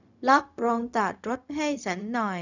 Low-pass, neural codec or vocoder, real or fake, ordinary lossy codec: 7.2 kHz; codec, 16 kHz, 0.4 kbps, LongCat-Audio-Codec; fake; none